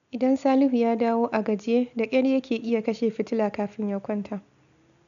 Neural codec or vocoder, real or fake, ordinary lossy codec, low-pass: none; real; none; 7.2 kHz